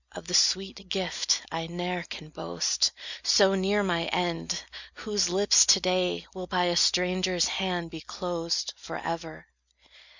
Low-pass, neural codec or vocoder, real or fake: 7.2 kHz; none; real